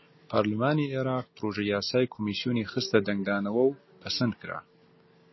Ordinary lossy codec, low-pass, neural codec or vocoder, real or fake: MP3, 24 kbps; 7.2 kHz; none; real